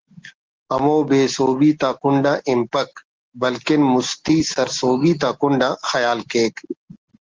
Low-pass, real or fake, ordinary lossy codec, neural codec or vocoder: 7.2 kHz; real; Opus, 16 kbps; none